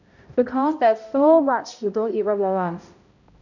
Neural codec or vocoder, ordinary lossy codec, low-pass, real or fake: codec, 16 kHz, 0.5 kbps, X-Codec, HuBERT features, trained on balanced general audio; none; 7.2 kHz; fake